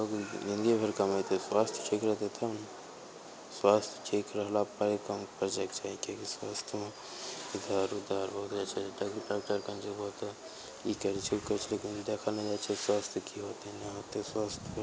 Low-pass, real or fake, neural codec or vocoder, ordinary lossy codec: none; real; none; none